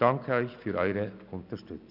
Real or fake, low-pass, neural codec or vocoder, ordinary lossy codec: real; 5.4 kHz; none; none